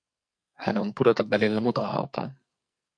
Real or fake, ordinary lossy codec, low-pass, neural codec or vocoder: fake; MP3, 64 kbps; 9.9 kHz; codec, 44.1 kHz, 2.6 kbps, SNAC